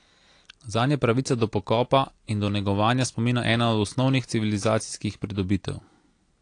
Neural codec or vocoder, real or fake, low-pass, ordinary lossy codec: none; real; 9.9 kHz; AAC, 48 kbps